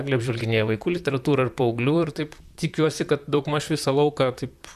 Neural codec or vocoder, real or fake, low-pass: vocoder, 44.1 kHz, 128 mel bands, Pupu-Vocoder; fake; 14.4 kHz